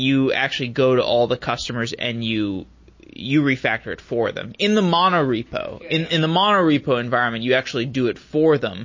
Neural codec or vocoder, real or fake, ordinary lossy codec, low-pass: none; real; MP3, 32 kbps; 7.2 kHz